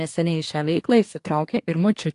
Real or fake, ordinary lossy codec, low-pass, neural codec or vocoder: fake; AAC, 48 kbps; 10.8 kHz; codec, 24 kHz, 1 kbps, SNAC